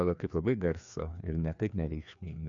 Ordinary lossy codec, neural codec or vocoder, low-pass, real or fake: MP3, 64 kbps; codec, 16 kHz, 2 kbps, FreqCodec, larger model; 7.2 kHz; fake